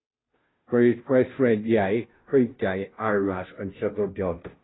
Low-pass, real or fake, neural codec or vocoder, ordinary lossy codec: 7.2 kHz; fake; codec, 16 kHz, 0.5 kbps, FunCodec, trained on Chinese and English, 25 frames a second; AAC, 16 kbps